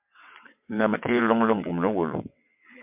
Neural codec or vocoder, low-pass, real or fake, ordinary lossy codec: vocoder, 22.05 kHz, 80 mel bands, WaveNeXt; 3.6 kHz; fake; MP3, 32 kbps